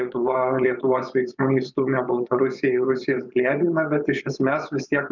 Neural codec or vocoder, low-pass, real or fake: vocoder, 24 kHz, 100 mel bands, Vocos; 7.2 kHz; fake